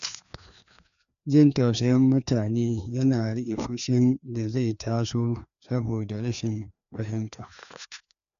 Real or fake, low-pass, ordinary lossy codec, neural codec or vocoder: fake; 7.2 kHz; none; codec, 16 kHz, 2 kbps, FreqCodec, larger model